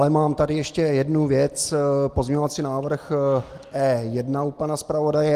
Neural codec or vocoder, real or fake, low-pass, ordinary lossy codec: none; real; 14.4 kHz; Opus, 24 kbps